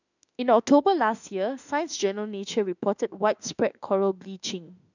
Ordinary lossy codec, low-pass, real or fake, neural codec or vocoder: none; 7.2 kHz; fake; autoencoder, 48 kHz, 32 numbers a frame, DAC-VAE, trained on Japanese speech